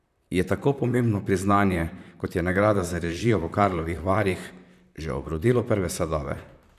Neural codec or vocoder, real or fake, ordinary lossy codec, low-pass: vocoder, 44.1 kHz, 128 mel bands, Pupu-Vocoder; fake; none; 14.4 kHz